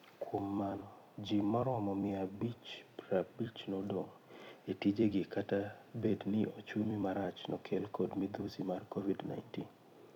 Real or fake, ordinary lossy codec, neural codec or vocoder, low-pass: fake; none; vocoder, 44.1 kHz, 128 mel bands every 256 samples, BigVGAN v2; 19.8 kHz